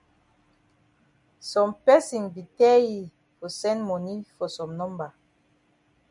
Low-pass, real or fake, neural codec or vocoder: 10.8 kHz; real; none